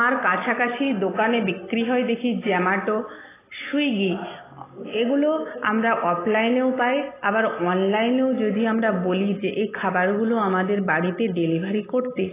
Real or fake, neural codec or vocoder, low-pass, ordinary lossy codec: real; none; 3.6 kHz; AAC, 16 kbps